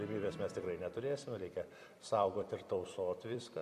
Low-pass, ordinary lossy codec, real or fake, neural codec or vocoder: 14.4 kHz; MP3, 96 kbps; fake; vocoder, 44.1 kHz, 128 mel bands every 256 samples, BigVGAN v2